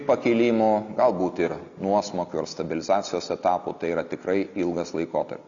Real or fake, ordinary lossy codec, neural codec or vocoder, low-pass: real; Opus, 64 kbps; none; 7.2 kHz